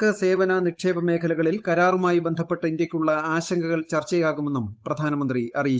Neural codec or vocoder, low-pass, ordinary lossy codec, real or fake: codec, 16 kHz, 8 kbps, FunCodec, trained on Chinese and English, 25 frames a second; none; none; fake